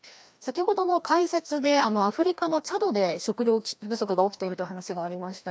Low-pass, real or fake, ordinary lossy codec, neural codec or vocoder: none; fake; none; codec, 16 kHz, 1 kbps, FreqCodec, larger model